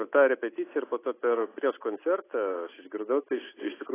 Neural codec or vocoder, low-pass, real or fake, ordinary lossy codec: none; 3.6 kHz; real; AAC, 16 kbps